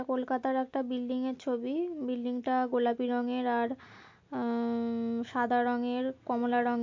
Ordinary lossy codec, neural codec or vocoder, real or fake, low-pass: MP3, 48 kbps; none; real; 7.2 kHz